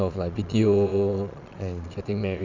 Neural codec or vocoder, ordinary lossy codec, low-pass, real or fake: vocoder, 22.05 kHz, 80 mel bands, Vocos; none; 7.2 kHz; fake